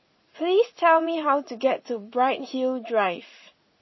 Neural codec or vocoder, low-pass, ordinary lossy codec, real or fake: vocoder, 44.1 kHz, 80 mel bands, Vocos; 7.2 kHz; MP3, 24 kbps; fake